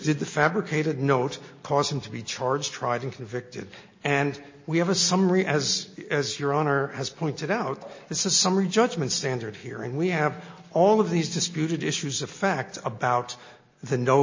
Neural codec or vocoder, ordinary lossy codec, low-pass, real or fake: none; MP3, 32 kbps; 7.2 kHz; real